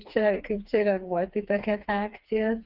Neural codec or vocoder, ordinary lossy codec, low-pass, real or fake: codec, 16 kHz, 4 kbps, FreqCodec, smaller model; Opus, 32 kbps; 5.4 kHz; fake